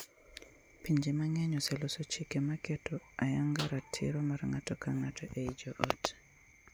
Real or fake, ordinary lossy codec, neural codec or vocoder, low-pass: real; none; none; none